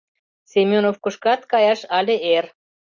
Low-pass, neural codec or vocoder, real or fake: 7.2 kHz; none; real